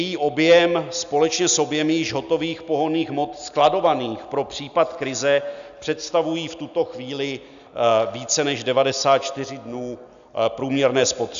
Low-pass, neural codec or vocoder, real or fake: 7.2 kHz; none; real